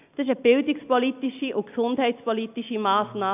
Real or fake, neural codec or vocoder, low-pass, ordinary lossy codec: real; none; 3.6 kHz; AAC, 32 kbps